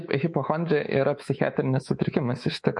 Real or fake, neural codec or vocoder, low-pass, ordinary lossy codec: fake; codec, 24 kHz, 3.1 kbps, DualCodec; 10.8 kHz; MP3, 48 kbps